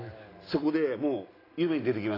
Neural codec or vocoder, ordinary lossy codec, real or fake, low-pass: none; AAC, 24 kbps; real; 5.4 kHz